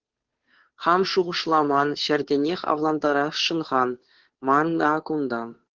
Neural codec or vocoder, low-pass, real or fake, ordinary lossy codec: codec, 16 kHz, 2 kbps, FunCodec, trained on Chinese and English, 25 frames a second; 7.2 kHz; fake; Opus, 16 kbps